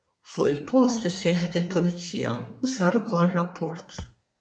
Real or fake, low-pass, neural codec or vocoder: fake; 9.9 kHz; codec, 24 kHz, 1 kbps, SNAC